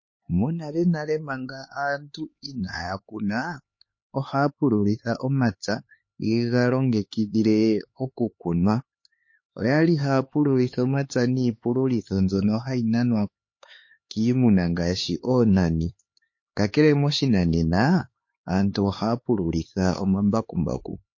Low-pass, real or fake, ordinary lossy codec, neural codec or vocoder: 7.2 kHz; fake; MP3, 32 kbps; codec, 16 kHz, 4 kbps, X-Codec, HuBERT features, trained on LibriSpeech